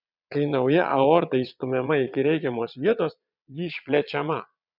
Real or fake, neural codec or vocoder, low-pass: fake; vocoder, 22.05 kHz, 80 mel bands, Vocos; 5.4 kHz